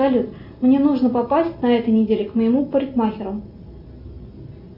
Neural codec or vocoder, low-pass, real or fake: none; 5.4 kHz; real